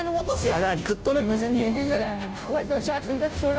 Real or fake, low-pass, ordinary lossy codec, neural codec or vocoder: fake; none; none; codec, 16 kHz, 0.5 kbps, FunCodec, trained on Chinese and English, 25 frames a second